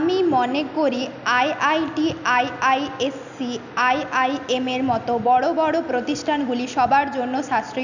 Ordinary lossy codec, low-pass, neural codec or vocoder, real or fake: none; 7.2 kHz; none; real